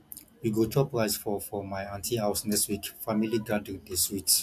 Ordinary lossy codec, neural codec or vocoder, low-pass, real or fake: MP3, 96 kbps; none; 14.4 kHz; real